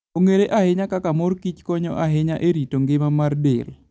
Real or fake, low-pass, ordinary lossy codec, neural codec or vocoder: real; none; none; none